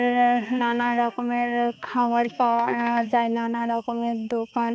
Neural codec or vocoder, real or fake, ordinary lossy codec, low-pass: codec, 16 kHz, 2 kbps, X-Codec, HuBERT features, trained on balanced general audio; fake; none; none